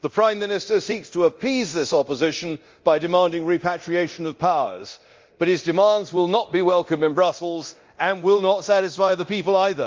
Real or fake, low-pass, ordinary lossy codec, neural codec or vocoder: fake; 7.2 kHz; Opus, 32 kbps; codec, 24 kHz, 0.9 kbps, DualCodec